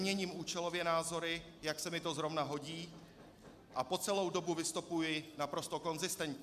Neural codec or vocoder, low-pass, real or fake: none; 14.4 kHz; real